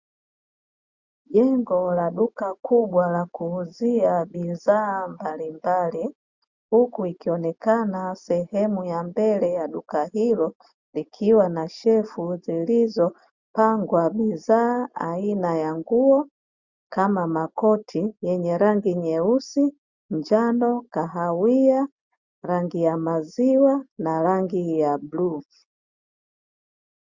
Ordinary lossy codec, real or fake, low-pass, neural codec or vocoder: Opus, 64 kbps; real; 7.2 kHz; none